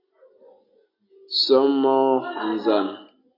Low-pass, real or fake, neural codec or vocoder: 5.4 kHz; real; none